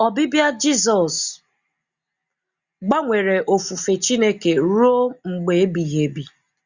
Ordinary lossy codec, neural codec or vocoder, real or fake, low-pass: Opus, 64 kbps; none; real; 7.2 kHz